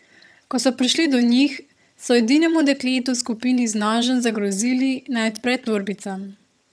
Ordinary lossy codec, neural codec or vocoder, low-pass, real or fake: none; vocoder, 22.05 kHz, 80 mel bands, HiFi-GAN; none; fake